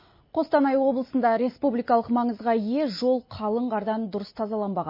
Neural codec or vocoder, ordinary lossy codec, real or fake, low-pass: none; MP3, 24 kbps; real; 5.4 kHz